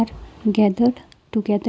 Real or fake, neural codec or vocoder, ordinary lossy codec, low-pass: real; none; none; none